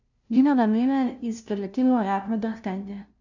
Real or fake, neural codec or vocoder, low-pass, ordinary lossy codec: fake; codec, 16 kHz, 0.5 kbps, FunCodec, trained on LibriTTS, 25 frames a second; 7.2 kHz; none